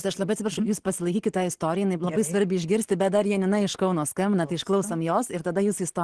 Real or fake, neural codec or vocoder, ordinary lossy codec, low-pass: real; none; Opus, 16 kbps; 10.8 kHz